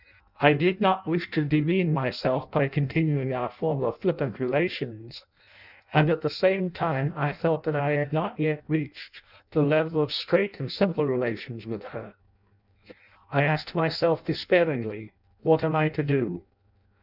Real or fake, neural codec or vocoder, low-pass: fake; codec, 16 kHz in and 24 kHz out, 0.6 kbps, FireRedTTS-2 codec; 5.4 kHz